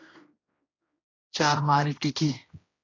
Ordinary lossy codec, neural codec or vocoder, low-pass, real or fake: AAC, 32 kbps; codec, 16 kHz, 1 kbps, X-Codec, HuBERT features, trained on general audio; 7.2 kHz; fake